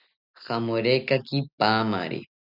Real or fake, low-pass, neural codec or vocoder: real; 5.4 kHz; none